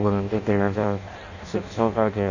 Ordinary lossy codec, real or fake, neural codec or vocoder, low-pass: none; fake; codec, 16 kHz in and 24 kHz out, 0.6 kbps, FireRedTTS-2 codec; 7.2 kHz